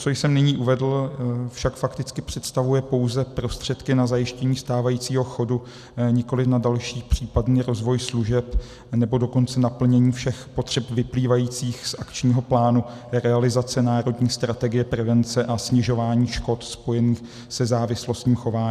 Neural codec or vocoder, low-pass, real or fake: none; 14.4 kHz; real